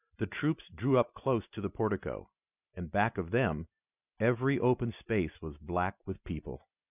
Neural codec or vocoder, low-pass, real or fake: none; 3.6 kHz; real